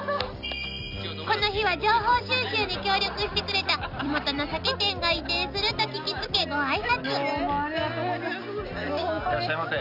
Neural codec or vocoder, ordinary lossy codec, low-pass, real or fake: none; none; 5.4 kHz; real